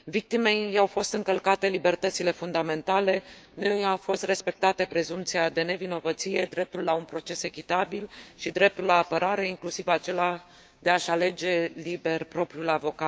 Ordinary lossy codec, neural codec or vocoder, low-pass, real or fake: none; codec, 16 kHz, 6 kbps, DAC; none; fake